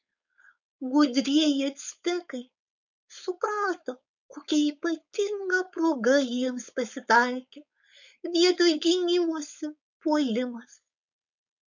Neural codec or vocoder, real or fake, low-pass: codec, 16 kHz, 4.8 kbps, FACodec; fake; 7.2 kHz